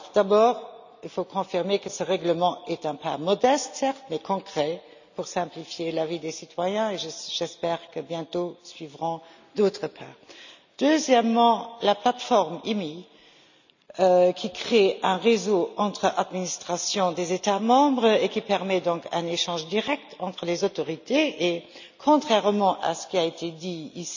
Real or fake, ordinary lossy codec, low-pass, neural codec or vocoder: real; none; 7.2 kHz; none